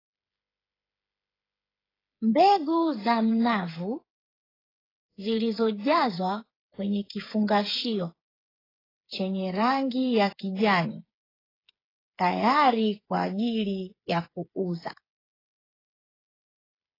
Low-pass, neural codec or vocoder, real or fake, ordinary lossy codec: 5.4 kHz; codec, 16 kHz, 8 kbps, FreqCodec, smaller model; fake; AAC, 24 kbps